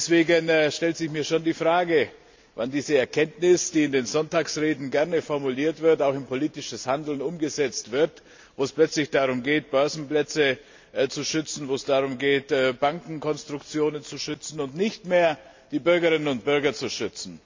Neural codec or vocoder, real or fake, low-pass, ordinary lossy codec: none; real; 7.2 kHz; MP3, 64 kbps